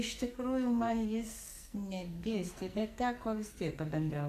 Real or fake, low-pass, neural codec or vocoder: fake; 14.4 kHz; codec, 44.1 kHz, 2.6 kbps, SNAC